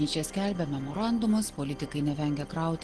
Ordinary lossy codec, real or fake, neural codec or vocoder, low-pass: Opus, 16 kbps; real; none; 10.8 kHz